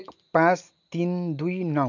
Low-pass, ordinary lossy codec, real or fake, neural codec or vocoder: 7.2 kHz; none; real; none